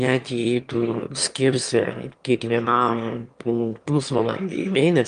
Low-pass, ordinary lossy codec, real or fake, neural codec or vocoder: 9.9 kHz; Opus, 32 kbps; fake; autoencoder, 22.05 kHz, a latent of 192 numbers a frame, VITS, trained on one speaker